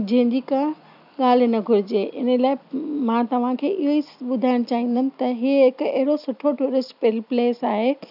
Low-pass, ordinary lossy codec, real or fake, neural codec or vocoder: 5.4 kHz; none; real; none